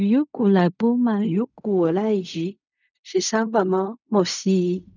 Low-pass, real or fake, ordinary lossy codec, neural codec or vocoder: 7.2 kHz; fake; none; codec, 16 kHz in and 24 kHz out, 0.4 kbps, LongCat-Audio-Codec, fine tuned four codebook decoder